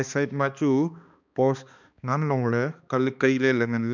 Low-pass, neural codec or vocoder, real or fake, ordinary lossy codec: 7.2 kHz; codec, 16 kHz, 2 kbps, X-Codec, HuBERT features, trained on balanced general audio; fake; none